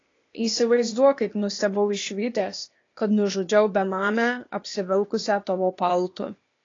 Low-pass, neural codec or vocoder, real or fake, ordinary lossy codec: 7.2 kHz; codec, 16 kHz, 0.8 kbps, ZipCodec; fake; AAC, 32 kbps